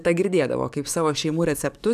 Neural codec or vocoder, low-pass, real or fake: codec, 44.1 kHz, 7.8 kbps, DAC; 14.4 kHz; fake